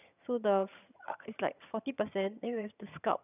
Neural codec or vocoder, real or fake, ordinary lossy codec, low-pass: vocoder, 22.05 kHz, 80 mel bands, HiFi-GAN; fake; none; 3.6 kHz